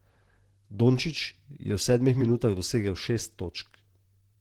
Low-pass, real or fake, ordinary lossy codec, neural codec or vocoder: 19.8 kHz; fake; Opus, 16 kbps; vocoder, 44.1 kHz, 128 mel bands, Pupu-Vocoder